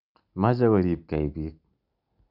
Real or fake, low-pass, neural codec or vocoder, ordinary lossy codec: real; 5.4 kHz; none; none